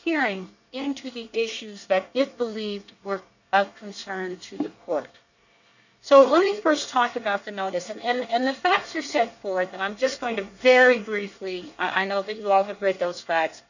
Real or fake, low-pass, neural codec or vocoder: fake; 7.2 kHz; codec, 24 kHz, 1 kbps, SNAC